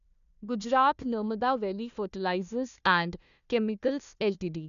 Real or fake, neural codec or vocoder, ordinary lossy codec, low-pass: fake; codec, 16 kHz, 1 kbps, FunCodec, trained on Chinese and English, 50 frames a second; none; 7.2 kHz